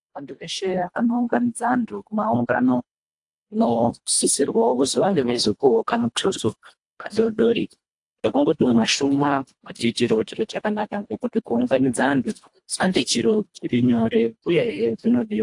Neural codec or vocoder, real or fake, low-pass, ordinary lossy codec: codec, 24 kHz, 1.5 kbps, HILCodec; fake; 10.8 kHz; AAC, 64 kbps